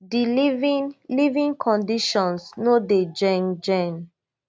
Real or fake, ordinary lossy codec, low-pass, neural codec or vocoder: real; none; none; none